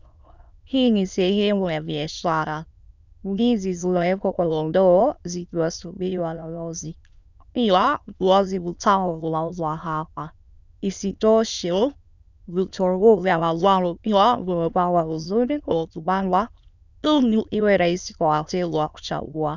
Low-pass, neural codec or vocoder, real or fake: 7.2 kHz; autoencoder, 22.05 kHz, a latent of 192 numbers a frame, VITS, trained on many speakers; fake